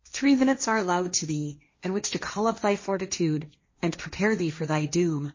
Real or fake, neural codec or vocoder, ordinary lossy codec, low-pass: fake; codec, 16 kHz, 1.1 kbps, Voila-Tokenizer; MP3, 32 kbps; 7.2 kHz